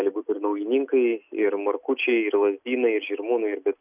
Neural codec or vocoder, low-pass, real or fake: none; 3.6 kHz; real